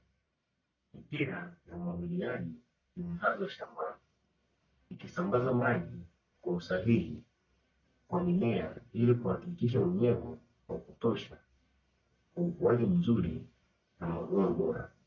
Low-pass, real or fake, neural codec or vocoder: 7.2 kHz; fake; codec, 44.1 kHz, 1.7 kbps, Pupu-Codec